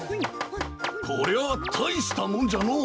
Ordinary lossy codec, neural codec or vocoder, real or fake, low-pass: none; none; real; none